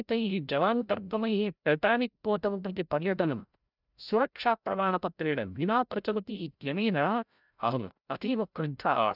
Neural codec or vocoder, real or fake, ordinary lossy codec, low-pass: codec, 16 kHz, 0.5 kbps, FreqCodec, larger model; fake; none; 5.4 kHz